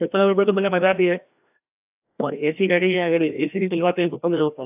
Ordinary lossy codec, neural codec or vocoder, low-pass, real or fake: AAC, 32 kbps; codec, 16 kHz, 1 kbps, FreqCodec, larger model; 3.6 kHz; fake